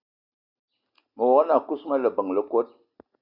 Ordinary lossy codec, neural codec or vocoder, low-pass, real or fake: Opus, 64 kbps; none; 5.4 kHz; real